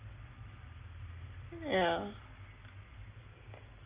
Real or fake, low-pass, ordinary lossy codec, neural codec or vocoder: real; 3.6 kHz; Opus, 24 kbps; none